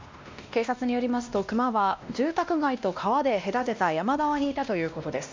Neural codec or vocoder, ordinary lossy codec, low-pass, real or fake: codec, 16 kHz, 1 kbps, X-Codec, WavLM features, trained on Multilingual LibriSpeech; MP3, 64 kbps; 7.2 kHz; fake